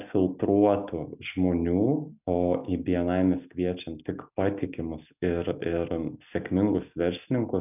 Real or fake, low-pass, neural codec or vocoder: real; 3.6 kHz; none